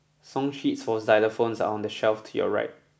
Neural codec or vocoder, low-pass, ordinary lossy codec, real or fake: none; none; none; real